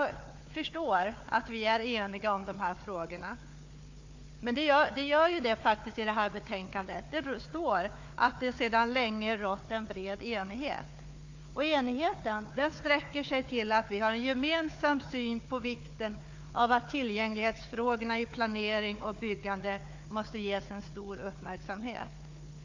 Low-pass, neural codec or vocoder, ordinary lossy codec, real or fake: 7.2 kHz; codec, 16 kHz, 4 kbps, FunCodec, trained on Chinese and English, 50 frames a second; AAC, 48 kbps; fake